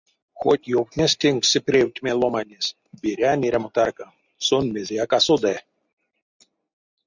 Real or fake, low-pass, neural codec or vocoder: real; 7.2 kHz; none